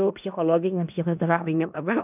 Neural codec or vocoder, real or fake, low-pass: codec, 16 kHz in and 24 kHz out, 0.4 kbps, LongCat-Audio-Codec, four codebook decoder; fake; 3.6 kHz